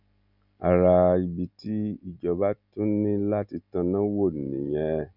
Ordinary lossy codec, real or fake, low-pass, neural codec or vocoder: none; real; 5.4 kHz; none